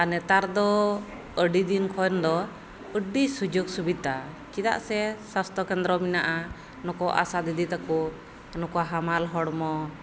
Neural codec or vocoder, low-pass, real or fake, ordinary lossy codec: none; none; real; none